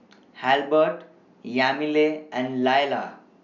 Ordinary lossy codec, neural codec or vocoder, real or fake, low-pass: none; none; real; 7.2 kHz